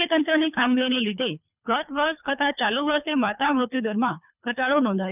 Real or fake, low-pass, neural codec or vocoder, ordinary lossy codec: fake; 3.6 kHz; codec, 24 kHz, 3 kbps, HILCodec; none